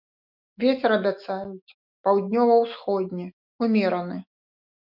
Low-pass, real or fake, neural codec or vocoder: 5.4 kHz; fake; autoencoder, 48 kHz, 128 numbers a frame, DAC-VAE, trained on Japanese speech